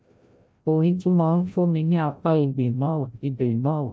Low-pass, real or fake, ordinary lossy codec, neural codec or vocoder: none; fake; none; codec, 16 kHz, 0.5 kbps, FreqCodec, larger model